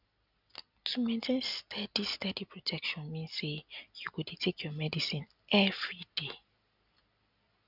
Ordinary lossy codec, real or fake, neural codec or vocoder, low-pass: none; real; none; 5.4 kHz